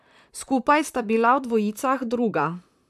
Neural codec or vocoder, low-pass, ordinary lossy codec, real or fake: vocoder, 44.1 kHz, 128 mel bands, Pupu-Vocoder; 14.4 kHz; none; fake